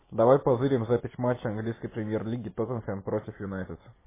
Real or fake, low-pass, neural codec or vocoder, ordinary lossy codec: real; 3.6 kHz; none; MP3, 16 kbps